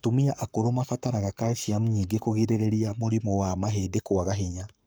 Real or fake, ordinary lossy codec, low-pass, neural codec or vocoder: fake; none; none; codec, 44.1 kHz, 7.8 kbps, Pupu-Codec